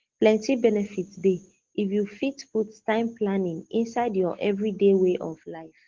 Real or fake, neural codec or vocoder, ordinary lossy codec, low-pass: real; none; Opus, 16 kbps; 7.2 kHz